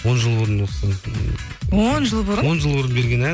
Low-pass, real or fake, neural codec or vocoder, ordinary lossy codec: none; real; none; none